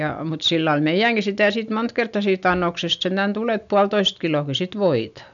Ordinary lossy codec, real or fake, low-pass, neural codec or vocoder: none; real; 7.2 kHz; none